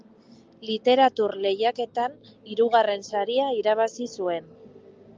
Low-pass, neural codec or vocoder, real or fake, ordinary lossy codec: 7.2 kHz; none; real; Opus, 32 kbps